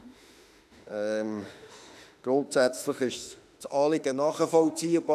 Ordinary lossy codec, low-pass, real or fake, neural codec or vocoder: none; 14.4 kHz; fake; autoencoder, 48 kHz, 32 numbers a frame, DAC-VAE, trained on Japanese speech